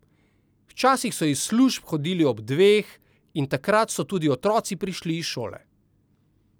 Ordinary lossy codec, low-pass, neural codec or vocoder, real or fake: none; none; none; real